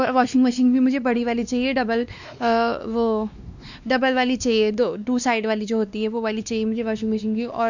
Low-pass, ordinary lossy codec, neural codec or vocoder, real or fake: 7.2 kHz; none; codec, 16 kHz, 2 kbps, X-Codec, WavLM features, trained on Multilingual LibriSpeech; fake